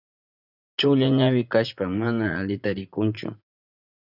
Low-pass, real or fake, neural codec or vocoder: 5.4 kHz; fake; vocoder, 44.1 kHz, 80 mel bands, Vocos